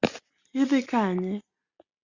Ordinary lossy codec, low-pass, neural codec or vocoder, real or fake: Opus, 64 kbps; 7.2 kHz; none; real